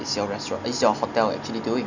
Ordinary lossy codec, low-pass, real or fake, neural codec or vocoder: none; 7.2 kHz; real; none